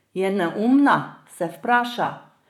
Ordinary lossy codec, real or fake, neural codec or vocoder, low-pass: none; fake; vocoder, 44.1 kHz, 128 mel bands, Pupu-Vocoder; 19.8 kHz